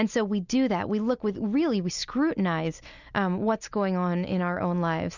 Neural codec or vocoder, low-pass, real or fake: none; 7.2 kHz; real